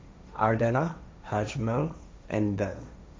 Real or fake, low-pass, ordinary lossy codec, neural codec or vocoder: fake; none; none; codec, 16 kHz, 1.1 kbps, Voila-Tokenizer